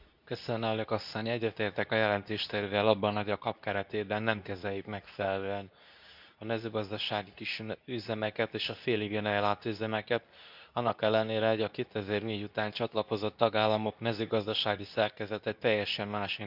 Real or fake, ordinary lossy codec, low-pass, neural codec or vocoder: fake; none; 5.4 kHz; codec, 24 kHz, 0.9 kbps, WavTokenizer, medium speech release version 2